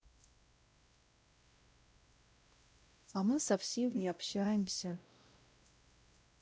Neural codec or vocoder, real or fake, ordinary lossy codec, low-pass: codec, 16 kHz, 0.5 kbps, X-Codec, WavLM features, trained on Multilingual LibriSpeech; fake; none; none